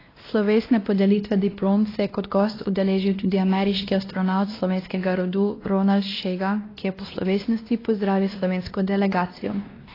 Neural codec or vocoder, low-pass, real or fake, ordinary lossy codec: codec, 16 kHz, 1 kbps, X-Codec, WavLM features, trained on Multilingual LibriSpeech; 5.4 kHz; fake; AAC, 24 kbps